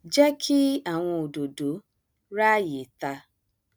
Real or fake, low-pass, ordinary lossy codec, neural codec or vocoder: real; none; none; none